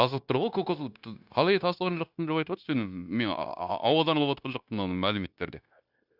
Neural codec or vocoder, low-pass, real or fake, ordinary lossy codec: codec, 16 kHz, 0.9 kbps, LongCat-Audio-Codec; 5.4 kHz; fake; none